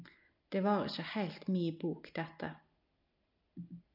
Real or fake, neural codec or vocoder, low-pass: real; none; 5.4 kHz